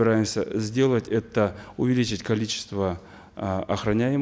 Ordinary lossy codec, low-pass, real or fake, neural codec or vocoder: none; none; real; none